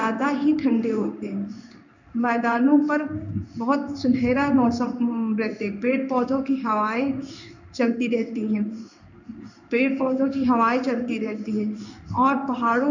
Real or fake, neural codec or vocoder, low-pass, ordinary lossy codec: fake; codec, 16 kHz in and 24 kHz out, 1 kbps, XY-Tokenizer; 7.2 kHz; none